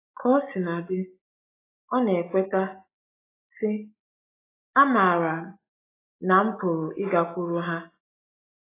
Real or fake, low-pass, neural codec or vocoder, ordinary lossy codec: real; 3.6 kHz; none; AAC, 16 kbps